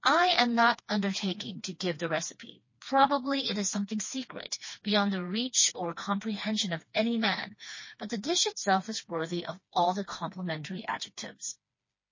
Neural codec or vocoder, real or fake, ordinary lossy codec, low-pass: codec, 16 kHz, 2 kbps, FreqCodec, smaller model; fake; MP3, 32 kbps; 7.2 kHz